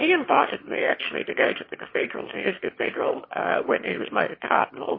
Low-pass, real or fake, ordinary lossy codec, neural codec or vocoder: 5.4 kHz; fake; MP3, 24 kbps; autoencoder, 22.05 kHz, a latent of 192 numbers a frame, VITS, trained on one speaker